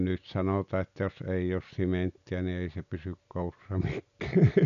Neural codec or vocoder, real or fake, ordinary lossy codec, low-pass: none; real; none; 7.2 kHz